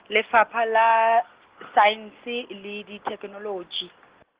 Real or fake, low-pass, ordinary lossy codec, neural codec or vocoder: real; 3.6 kHz; Opus, 16 kbps; none